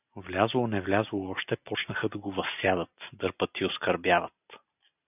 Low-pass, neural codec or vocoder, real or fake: 3.6 kHz; none; real